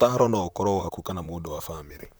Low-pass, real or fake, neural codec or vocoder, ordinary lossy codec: none; fake; vocoder, 44.1 kHz, 128 mel bands, Pupu-Vocoder; none